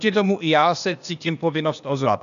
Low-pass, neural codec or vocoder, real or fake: 7.2 kHz; codec, 16 kHz, 0.8 kbps, ZipCodec; fake